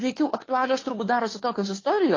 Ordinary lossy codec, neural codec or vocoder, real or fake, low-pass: AAC, 32 kbps; codec, 16 kHz, 2 kbps, FunCodec, trained on Chinese and English, 25 frames a second; fake; 7.2 kHz